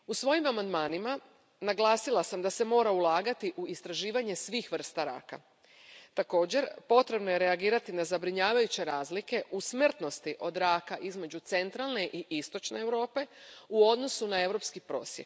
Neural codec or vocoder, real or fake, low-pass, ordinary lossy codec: none; real; none; none